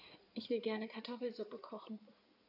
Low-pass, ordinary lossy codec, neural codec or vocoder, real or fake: 5.4 kHz; none; codec, 16 kHz in and 24 kHz out, 2.2 kbps, FireRedTTS-2 codec; fake